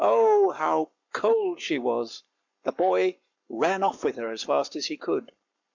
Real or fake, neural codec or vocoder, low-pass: fake; codec, 44.1 kHz, 7.8 kbps, Pupu-Codec; 7.2 kHz